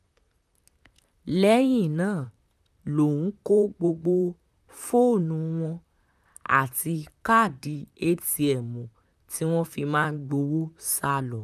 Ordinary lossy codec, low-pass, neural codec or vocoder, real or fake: none; 14.4 kHz; vocoder, 44.1 kHz, 128 mel bands, Pupu-Vocoder; fake